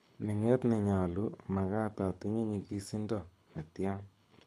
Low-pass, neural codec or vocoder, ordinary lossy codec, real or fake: none; codec, 24 kHz, 6 kbps, HILCodec; none; fake